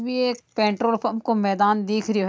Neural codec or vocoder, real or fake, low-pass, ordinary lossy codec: none; real; none; none